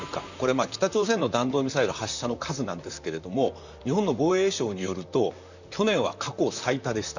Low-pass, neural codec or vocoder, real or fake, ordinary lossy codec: 7.2 kHz; vocoder, 44.1 kHz, 128 mel bands, Pupu-Vocoder; fake; none